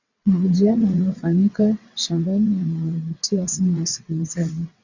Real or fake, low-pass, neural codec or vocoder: fake; 7.2 kHz; vocoder, 22.05 kHz, 80 mel bands, WaveNeXt